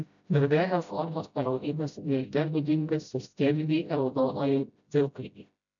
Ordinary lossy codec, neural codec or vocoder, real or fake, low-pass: MP3, 96 kbps; codec, 16 kHz, 0.5 kbps, FreqCodec, smaller model; fake; 7.2 kHz